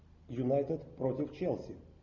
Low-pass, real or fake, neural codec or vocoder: 7.2 kHz; real; none